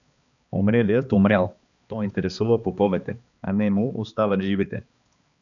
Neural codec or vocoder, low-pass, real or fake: codec, 16 kHz, 2 kbps, X-Codec, HuBERT features, trained on balanced general audio; 7.2 kHz; fake